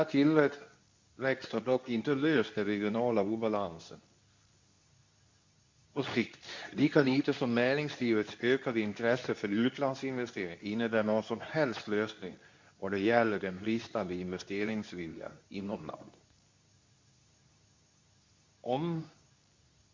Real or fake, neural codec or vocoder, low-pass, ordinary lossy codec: fake; codec, 24 kHz, 0.9 kbps, WavTokenizer, medium speech release version 2; 7.2 kHz; MP3, 64 kbps